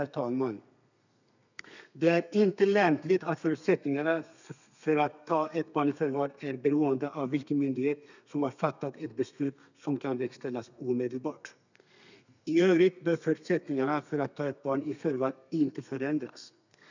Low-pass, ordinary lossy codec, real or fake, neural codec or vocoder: 7.2 kHz; none; fake; codec, 32 kHz, 1.9 kbps, SNAC